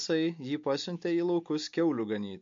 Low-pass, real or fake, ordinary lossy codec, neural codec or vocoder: 7.2 kHz; real; MP3, 48 kbps; none